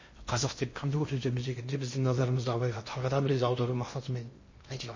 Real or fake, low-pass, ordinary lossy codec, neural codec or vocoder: fake; 7.2 kHz; MP3, 32 kbps; codec, 16 kHz in and 24 kHz out, 0.8 kbps, FocalCodec, streaming, 65536 codes